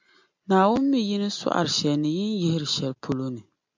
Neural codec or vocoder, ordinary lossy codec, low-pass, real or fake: none; MP3, 64 kbps; 7.2 kHz; real